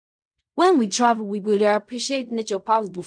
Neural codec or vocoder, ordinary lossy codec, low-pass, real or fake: codec, 16 kHz in and 24 kHz out, 0.4 kbps, LongCat-Audio-Codec, fine tuned four codebook decoder; none; 9.9 kHz; fake